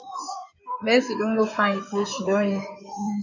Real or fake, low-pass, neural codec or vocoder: fake; 7.2 kHz; codec, 16 kHz in and 24 kHz out, 2.2 kbps, FireRedTTS-2 codec